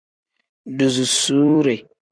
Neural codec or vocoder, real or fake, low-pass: vocoder, 44.1 kHz, 128 mel bands every 256 samples, BigVGAN v2; fake; 9.9 kHz